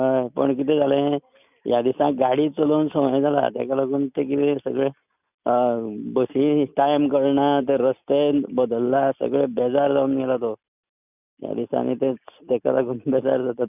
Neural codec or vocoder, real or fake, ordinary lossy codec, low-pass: none; real; none; 3.6 kHz